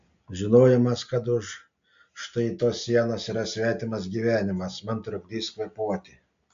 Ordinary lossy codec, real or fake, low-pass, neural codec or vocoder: AAC, 64 kbps; real; 7.2 kHz; none